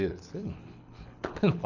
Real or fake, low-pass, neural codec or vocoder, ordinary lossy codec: fake; 7.2 kHz; codec, 24 kHz, 6 kbps, HILCodec; none